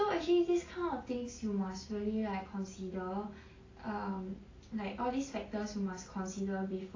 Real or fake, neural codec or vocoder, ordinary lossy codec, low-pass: real; none; AAC, 32 kbps; 7.2 kHz